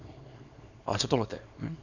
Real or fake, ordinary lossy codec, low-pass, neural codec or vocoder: fake; none; 7.2 kHz; codec, 24 kHz, 0.9 kbps, WavTokenizer, small release